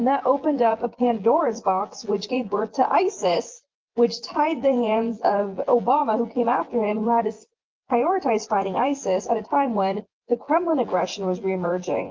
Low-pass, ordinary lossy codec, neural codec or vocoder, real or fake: 7.2 kHz; Opus, 32 kbps; vocoder, 24 kHz, 100 mel bands, Vocos; fake